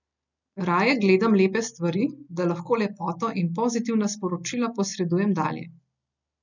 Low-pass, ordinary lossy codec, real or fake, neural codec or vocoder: 7.2 kHz; none; real; none